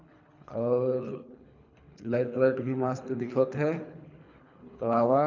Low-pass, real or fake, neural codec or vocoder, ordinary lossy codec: 7.2 kHz; fake; codec, 24 kHz, 3 kbps, HILCodec; none